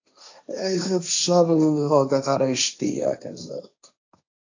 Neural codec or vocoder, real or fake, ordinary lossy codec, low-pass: codec, 16 kHz, 1.1 kbps, Voila-Tokenizer; fake; AAC, 48 kbps; 7.2 kHz